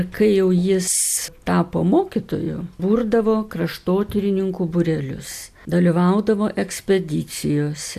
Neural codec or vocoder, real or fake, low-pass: none; real; 14.4 kHz